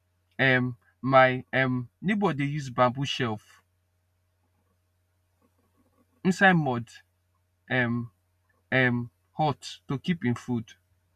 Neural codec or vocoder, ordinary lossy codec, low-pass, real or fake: none; none; 14.4 kHz; real